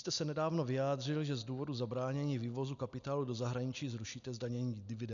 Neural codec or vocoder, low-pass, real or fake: none; 7.2 kHz; real